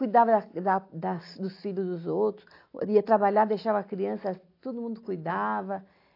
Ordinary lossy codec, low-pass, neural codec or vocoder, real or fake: AAC, 32 kbps; 5.4 kHz; none; real